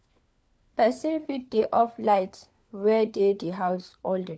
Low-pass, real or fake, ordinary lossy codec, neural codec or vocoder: none; fake; none; codec, 16 kHz, 4 kbps, FunCodec, trained on LibriTTS, 50 frames a second